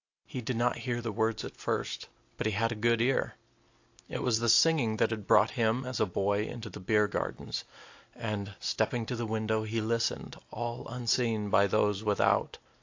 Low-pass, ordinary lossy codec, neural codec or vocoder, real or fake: 7.2 kHz; AAC, 48 kbps; none; real